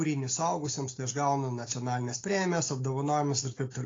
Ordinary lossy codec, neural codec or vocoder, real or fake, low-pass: AAC, 32 kbps; none; real; 7.2 kHz